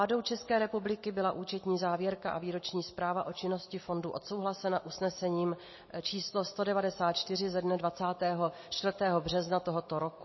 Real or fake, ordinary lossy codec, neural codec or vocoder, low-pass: real; MP3, 24 kbps; none; 7.2 kHz